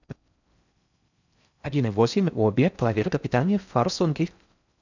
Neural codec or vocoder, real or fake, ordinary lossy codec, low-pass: codec, 16 kHz in and 24 kHz out, 0.6 kbps, FocalCodec, streaming, 4096 codes; fake; none; 7.2 kHz